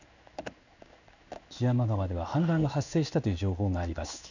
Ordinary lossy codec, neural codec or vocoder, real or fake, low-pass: none; codec, 16 kHz in and 24 kHz out, 1 kbps, XY-Tokenizer; fake; 7.2 kHz